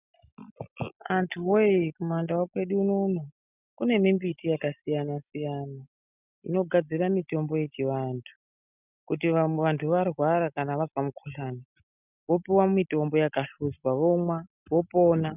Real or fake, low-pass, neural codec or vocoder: real; 3.6 kHz; none